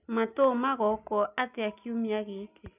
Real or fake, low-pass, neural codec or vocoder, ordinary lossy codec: real; 3.6 kHz; none; none